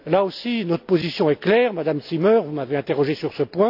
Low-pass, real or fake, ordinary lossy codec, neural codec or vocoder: 5.4 kHz; real; AAC, 48 kbps; none